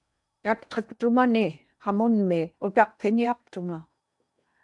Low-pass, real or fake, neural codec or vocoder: 10.8 kHz; fake; codec, 16 kHz in and 24 kHz out, 0.8 kbps, FocalCodec, streaming, 65536 codes